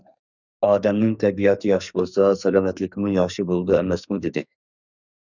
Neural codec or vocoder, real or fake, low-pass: codec, 44.1 kHz, 2.6 kbps, SNAC; fake; 7.2 kHz